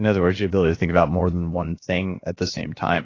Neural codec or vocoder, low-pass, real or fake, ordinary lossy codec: codec, 16 kHz, 0.7 kbps, FocalCodec; 7.2 kHz; fake; AAC, 32 kbps